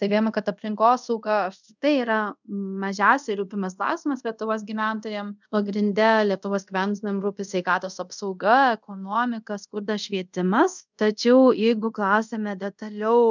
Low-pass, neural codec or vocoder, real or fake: 7.2 kHz; codec, 24 kHz, 0.5 kbps, DualCodec; fake